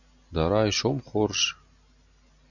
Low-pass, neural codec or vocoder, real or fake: 7.2 kHz; none; real